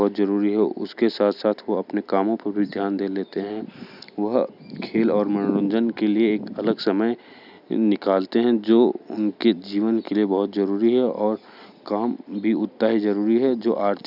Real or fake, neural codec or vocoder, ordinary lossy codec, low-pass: real; none; none; 5.4 kHz